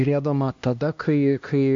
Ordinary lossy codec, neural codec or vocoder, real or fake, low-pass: MP3, 48 kbps; codec, 16 kHz, 1 kbps, X-Codec, HuBERT features, trained on LibriSpeech; fake; 7.2 kHz